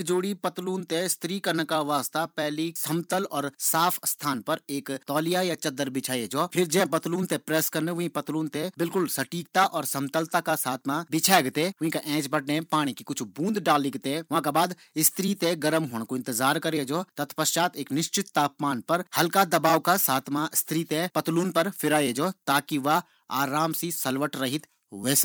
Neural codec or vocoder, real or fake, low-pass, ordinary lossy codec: vocoder, 44.1 kHz, 128 mel bands every 256 samples, BigVGAN v2; fake; none; none